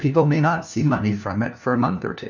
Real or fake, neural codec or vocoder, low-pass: fake; codec, 16 kHz, 1 kbps, FunCodec, trained on LibriTTS, 50 frames a second; 7.2 kHz